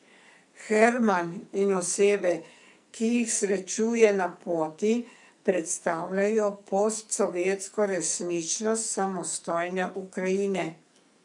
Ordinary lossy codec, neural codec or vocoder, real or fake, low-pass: none; codec, 44.1 kHz, 2.6 kbps, SNAC; fake; 10.8 kHz